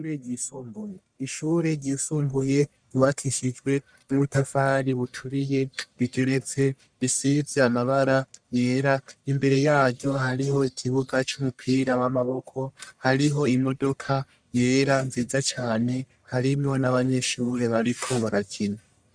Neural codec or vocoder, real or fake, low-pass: codec, 44.1 kHz, 1.7 kbps, Pupu-Codec; fake; 9.9 kHz